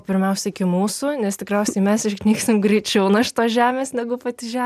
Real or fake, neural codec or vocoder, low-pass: real; none; 14.4 kHz